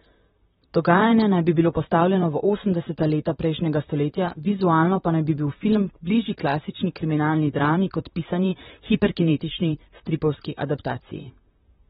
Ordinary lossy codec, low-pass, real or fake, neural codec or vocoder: AAC, 16 kbps; 19.8 kHz; fake; vocoder, 44.1 kHz, 128 mel bands, Pupu-Vocoder